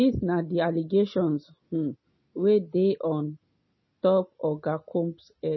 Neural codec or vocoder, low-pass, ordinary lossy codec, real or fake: none; 7.2 kHz; MP3, 24 kbps; real